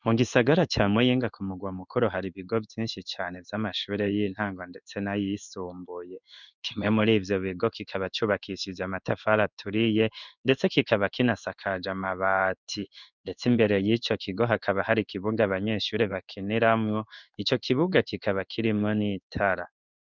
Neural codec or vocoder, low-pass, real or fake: codec, 16 kHz in and 24 kHz out, 1 kbps, XY-Tokenizer; 7.2 kHz; fake